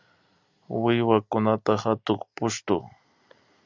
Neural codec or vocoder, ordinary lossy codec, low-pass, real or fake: none; Opus, 64 kbps; 7.2 kHz; real